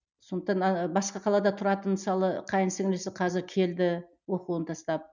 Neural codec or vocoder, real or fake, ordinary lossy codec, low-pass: none; real; none; 7.2 kHz